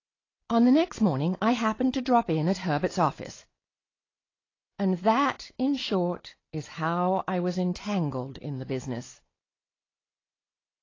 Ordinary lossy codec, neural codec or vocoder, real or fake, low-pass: AAC, 32 kbps; none; real; 7.2 kHz